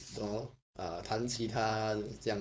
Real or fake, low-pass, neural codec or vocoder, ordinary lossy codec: fake; none; codec, 16 kHz, 4.8 kbps, FACodec; none